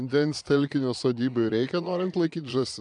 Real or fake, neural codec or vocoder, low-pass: fake; vocoder, 22.05 kHz, 80 mel bands, Vocos; 9.9 kHz